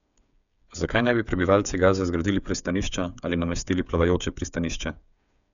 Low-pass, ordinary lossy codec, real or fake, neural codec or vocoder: 7.2 kHz; none; fake; codec, 16 kHz, 8 kbps, FreqCodec, smaller model